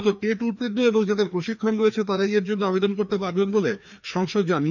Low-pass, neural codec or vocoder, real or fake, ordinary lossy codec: 7.2 kHz; codec, 16 kHz, 2 kbps, FreqCodec, larger model; fake; none